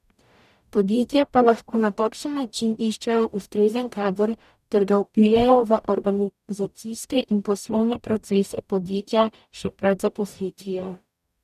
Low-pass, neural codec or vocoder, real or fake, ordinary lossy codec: 14.4 kHz; codec, 44.1 kHz, 0.9 kbps, DAC; fake; none